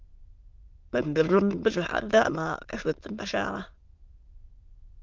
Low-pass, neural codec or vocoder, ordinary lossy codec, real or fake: 7.2 kHz; autoencoder, 22.05 kHz, a latent of 192 numbers a frame, VITS, trained on many speakers; Opus, 32 kbps; fake